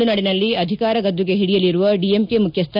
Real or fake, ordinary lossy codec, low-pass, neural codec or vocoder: real; none; 5.4 kHz; none